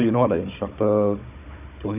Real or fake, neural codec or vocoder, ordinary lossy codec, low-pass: fake; codec, 16 kHz, 16 kbps, FunCodec, trained on Chinese and English, 50 frames a second; none; 3.6 kHz